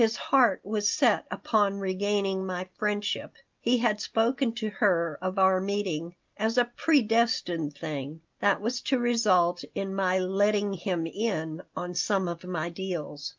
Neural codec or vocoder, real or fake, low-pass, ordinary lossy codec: none; real; 7.2 kHz; Opus, 24 kbps